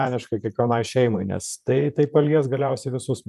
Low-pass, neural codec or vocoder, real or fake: 14.4 kHz; vocoder, 44.1 kHz, 128 mel bands, Pupu-Vocoder; fake